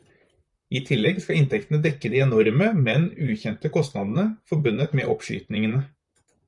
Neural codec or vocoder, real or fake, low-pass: vocoder, 44.1 kHz, 128 mel bands, Pupu-Vocoder; fake; 10.8 kHz